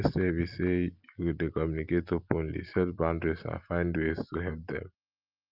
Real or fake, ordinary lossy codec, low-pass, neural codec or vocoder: real; Opus, 32 kbps; 5.4 kHz; none